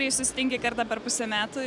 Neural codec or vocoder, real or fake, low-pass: none; real; 10.8 kHz